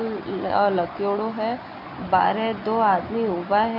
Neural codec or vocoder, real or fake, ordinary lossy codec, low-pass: none; real; none; 5.4 kHz